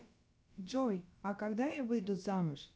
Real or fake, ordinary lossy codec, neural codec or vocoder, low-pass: fake; none; codec, 16 kHz, about 1 kbps, DyCAST, with the encoder's durations; none